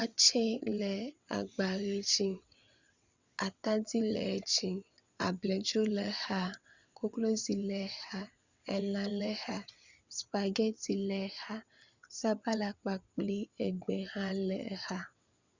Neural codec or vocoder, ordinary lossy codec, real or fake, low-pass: vocoder, 22.05 kHz, 80 mel bands, WaveNeXt; Opus, 64 kbps; fake; 7.2 kHz